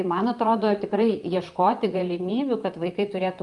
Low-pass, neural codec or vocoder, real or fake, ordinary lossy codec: 10.8 kHz; vocoder, 44.1 kHz, 128 mel bands, Pupu-Vocoder; fake; Opus, 32 kbps